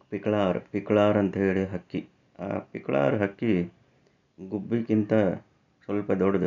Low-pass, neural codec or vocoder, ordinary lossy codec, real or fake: 7.2 kHz; none; none; real